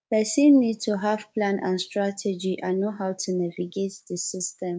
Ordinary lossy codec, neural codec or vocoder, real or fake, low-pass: none; codec, 16 kHz, 6 kbps, DAC; fake; none